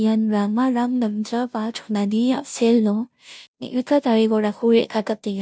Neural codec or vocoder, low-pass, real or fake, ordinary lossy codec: codec, 16 kHz, 0.5 kbps, FunCodec, trained on Chinese and English, 25 frames a second; none; fake; none